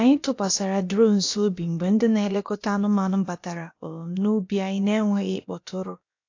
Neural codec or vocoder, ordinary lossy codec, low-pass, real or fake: codec, 16 kHz, about 1 kbps, DyCAST, with the encoder's durations; AAC, 48 kbps; 7.2 kHz; fake